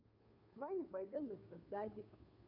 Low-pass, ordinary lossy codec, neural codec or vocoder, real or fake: 5.4 kHz; none; codec, 16 kHz, 2 kbps, FunCodec, trained on Chinese and English, 25 frames a second; fake